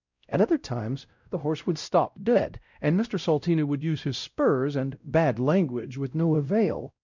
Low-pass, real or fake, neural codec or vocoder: 7.2 kHz; fake; codec, 16 kHz, 0.5 kbps, X-Codec, WavLM features, trained on Multilingual LibriSpeech